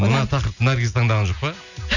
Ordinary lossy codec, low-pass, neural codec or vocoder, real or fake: none; 7.2 kHz; none; real